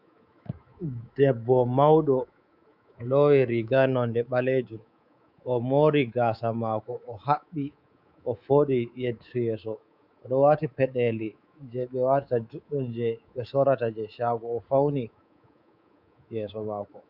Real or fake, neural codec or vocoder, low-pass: fake; codec, 24 kHz, 3.1 kbps, DualCodec; 5.4 kHz